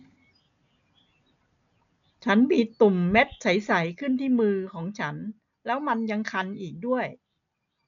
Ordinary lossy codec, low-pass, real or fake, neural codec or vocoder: none; 7.2 kHz; real; none